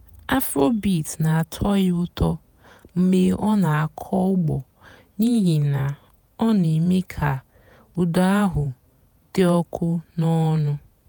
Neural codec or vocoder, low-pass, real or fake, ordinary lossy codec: vocoder, 48 kHz, 128 mel bands, Vocos; none; fake; none